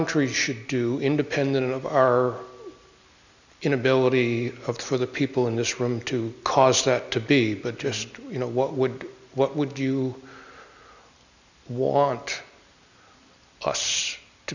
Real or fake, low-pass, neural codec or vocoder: real; 7.2 kHz; none